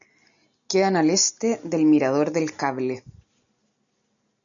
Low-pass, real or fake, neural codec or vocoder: 7.2 kHz; real; none